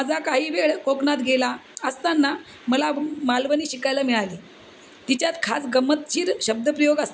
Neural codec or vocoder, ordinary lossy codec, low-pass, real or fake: none; none; none; real